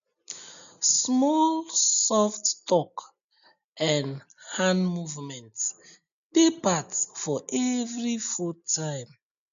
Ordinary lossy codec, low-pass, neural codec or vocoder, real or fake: none; 7.2 kHz; none; real